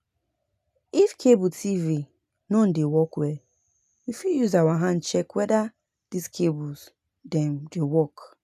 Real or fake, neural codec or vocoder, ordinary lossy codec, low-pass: real; none; none; 14.4 kHz